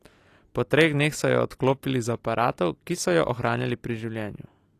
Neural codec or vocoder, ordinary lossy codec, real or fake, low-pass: none; AAC, 48 kbps; real; 14.4 kHz